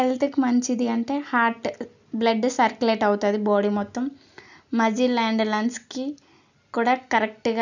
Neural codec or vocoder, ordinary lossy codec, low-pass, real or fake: none; none; 7.2 kHz; real